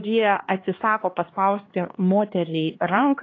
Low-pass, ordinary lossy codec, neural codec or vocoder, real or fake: 7.2 kHz; AAC, 32 kbps; codec, 16 kHz, 2 kbps, X-Codec, HuBERT features, trained on LibriSpeech; fake